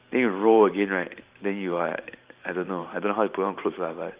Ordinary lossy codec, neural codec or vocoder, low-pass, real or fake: Opus, 24 kbps; vocoder, 44.1 kHz, 128 mel bands every 512 samples, BigVGAN v2; 3.6 kHz; fake